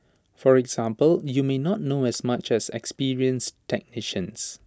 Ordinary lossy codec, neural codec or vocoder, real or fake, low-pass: none; none; real; none